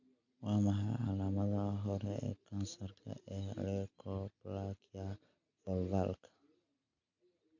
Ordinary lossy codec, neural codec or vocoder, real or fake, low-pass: MP3, 48 kbps; none; real; 7.2 kHz